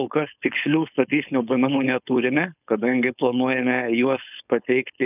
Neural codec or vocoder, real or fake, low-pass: codec, 16 kHz, 4.8 kbps, FACodec; fake; 3.6 kHz